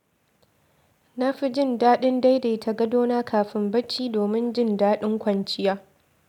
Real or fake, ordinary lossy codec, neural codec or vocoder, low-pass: real; none; none; 19.8 kHz